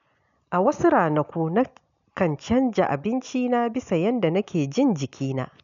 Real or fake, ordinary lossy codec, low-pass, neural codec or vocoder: real; none; 7.2 kHz; none